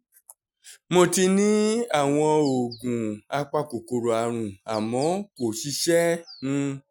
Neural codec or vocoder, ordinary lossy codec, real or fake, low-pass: none; none; real; 19.8 kHz